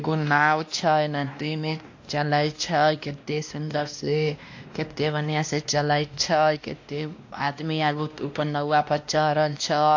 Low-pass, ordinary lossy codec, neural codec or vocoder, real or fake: 7.2 kHz; AAC, 48 kbps; codec, 16 kHz, 1 kbps, X-Codec, WavLM features, trained on Multilingual LibriSpeech; fake